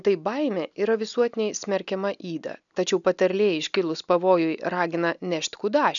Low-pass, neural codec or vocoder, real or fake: 7.2 kHz; none; real